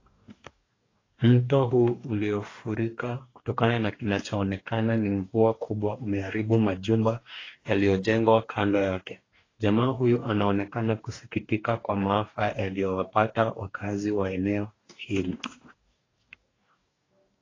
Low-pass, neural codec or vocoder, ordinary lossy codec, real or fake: 7.2 kHz; codec, 44.1 kHz, 2.6 kbps, DAC; AAC, 32 kbps; fake